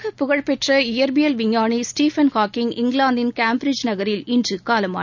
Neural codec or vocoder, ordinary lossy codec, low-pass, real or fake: none; none; 7.2 kHz; real